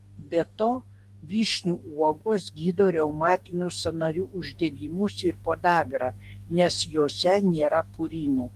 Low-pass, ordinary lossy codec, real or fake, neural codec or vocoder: 14.4 kHz; Opus, 32 kbps; fake; codec, 44.1 kHz, 2.6 kbps, DAC